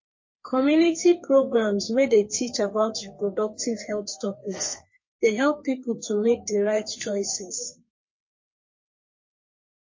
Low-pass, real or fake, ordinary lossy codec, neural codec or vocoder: 7.2 kHz; fake; MP3, 32 kbps; codec, 32 kHz, 1.9 kbps, SNAC